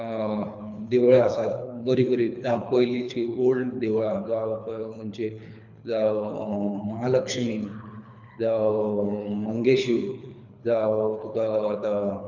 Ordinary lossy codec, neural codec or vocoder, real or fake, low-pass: none; codec, 24 kHz, 3 kbps, HILCodec; fake; 7.2 kHz